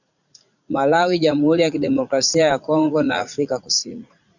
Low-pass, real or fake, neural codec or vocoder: 7.2 kHz; fake; vocoder, 44.1 kHz, 80 mel bands, Vocos